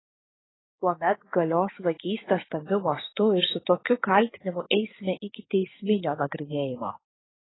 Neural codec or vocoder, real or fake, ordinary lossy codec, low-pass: codec, 16 kHz, 6 kbps, DAC; fake; AAC, 16 kbps; 7.2 kHz